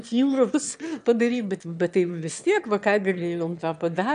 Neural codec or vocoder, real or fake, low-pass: autoencoder, 22.05 kHz, a latent of 192 numbers a frame, VITS, trained on one speaker; fake; 9.9 kHz